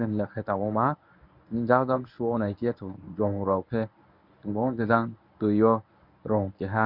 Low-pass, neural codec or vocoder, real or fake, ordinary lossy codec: 5.4 kHz; codec, 24 kHz, 0.9 kbps, WavTokenizer, medium speech release version 1; fake; none